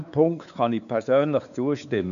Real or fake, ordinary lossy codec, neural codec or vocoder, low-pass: fake; none; codec, 16 kHz, 4 kbps, X-Codec, WavLM features, trained on Multilingual LibriSpeech; 7.2 kHz